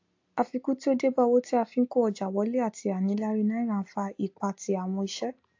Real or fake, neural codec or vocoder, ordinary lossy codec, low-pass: real; none; AAC, 48 kbps; 7.2 kHz